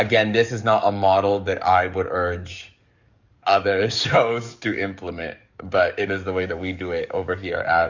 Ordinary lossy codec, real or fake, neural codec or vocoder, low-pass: Opus, 64 kbps; fake; codec, 44.1 kHz, 7.8 kbps, DAC; 7.2 kHz